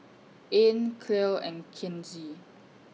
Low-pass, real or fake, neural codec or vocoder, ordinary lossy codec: none; real; none; none